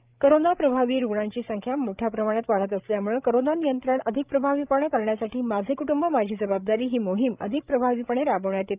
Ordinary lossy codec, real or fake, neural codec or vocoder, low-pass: Opus, 24 kbps; fake; codec, 16 kHz, 16 kbps, FreqCodec, larger model; 3.6 kHz